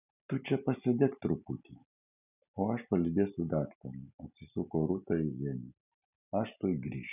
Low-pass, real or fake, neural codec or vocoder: 3.6 kHz; real; none